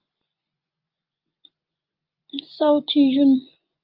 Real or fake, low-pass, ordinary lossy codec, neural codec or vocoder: fake; 5.4 kHz; Opus, 24 kbps; vocoder, 24 kHz, 100 mel bands, Vocos